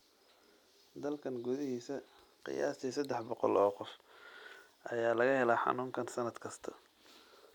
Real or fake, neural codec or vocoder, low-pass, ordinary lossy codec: real; none; 19.8 kHz; none